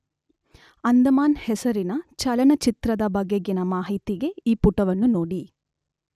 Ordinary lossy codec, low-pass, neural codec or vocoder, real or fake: none; 14.4 kHz; none; real